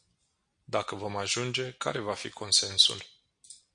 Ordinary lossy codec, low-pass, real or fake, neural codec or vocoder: MP3, 48 kbps; 9.9 kHz; real; none